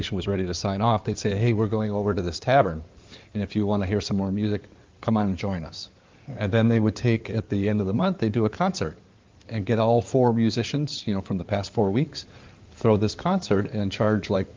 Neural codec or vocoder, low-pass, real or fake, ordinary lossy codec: codec, 16 kHz in and 24 kHz out, 2.2 kbps, FireRedTTS-2 codec; 7.2 kHz; fake; Opus, 24 kbps